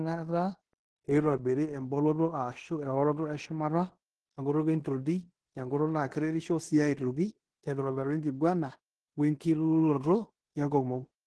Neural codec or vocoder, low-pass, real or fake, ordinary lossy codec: codec, 16 kHz in and 24 kHz out, 0.9 kbps, LongCat-Audio-Codec, fine tuned four codebook decoder; 10.8 kHz; fake; Opus, 16 kbps